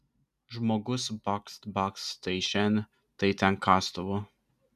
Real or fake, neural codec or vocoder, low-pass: real; none; 14.4 kHz